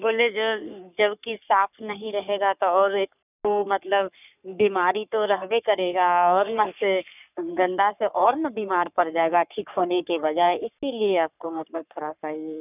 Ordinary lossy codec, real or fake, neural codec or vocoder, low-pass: none; fake; codec, 44.1 kHz, 3.4 kbps, Pupu-Codec; 3.6 kHz